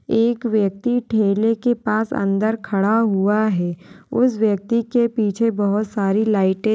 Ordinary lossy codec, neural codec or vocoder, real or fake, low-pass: none; none; real; none